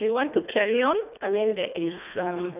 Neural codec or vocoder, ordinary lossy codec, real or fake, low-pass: codec, 24 kHz, 1.5 kbps, HILCodec; none; fake; 3.6 kHz